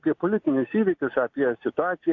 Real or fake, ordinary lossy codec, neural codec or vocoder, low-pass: real; AAC, 48 kbps; none; 7.2 kHz